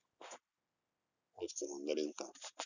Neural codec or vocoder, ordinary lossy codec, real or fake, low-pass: none; none; real; 7.2 kHz